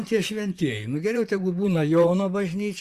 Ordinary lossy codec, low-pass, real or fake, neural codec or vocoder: Opus, 64 kbps; 14.4 kHz; fake; vocoder, 44.1 kHz, 128 mel bands, Pupu-Vocoder